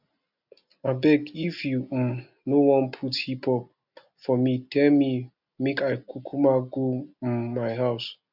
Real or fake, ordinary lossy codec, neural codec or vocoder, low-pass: real; none; none; 5.4 kHz